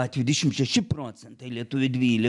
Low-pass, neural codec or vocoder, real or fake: 10.8 kHz; none; real